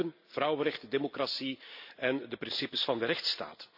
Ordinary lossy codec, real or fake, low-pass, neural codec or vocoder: AAC, 48 kbps; real; 5.4 kHz; none